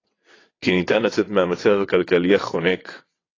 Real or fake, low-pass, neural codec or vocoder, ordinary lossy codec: fake; 7.2 kHz; vocoder, 22.05 kHz, 80 mel bands, WaveNeXt; AAC, 32 kbps